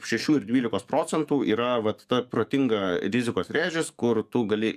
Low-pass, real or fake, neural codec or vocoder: 14.4 kHz; fake; codec, 44.1 kHz, 7.8 kbps, DAC